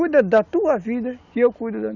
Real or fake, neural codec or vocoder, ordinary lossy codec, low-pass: real; none; none; 7.2 kHz